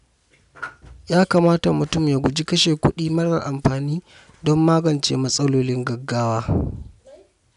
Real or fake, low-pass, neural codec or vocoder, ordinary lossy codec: real; 10.8 kHz; none; none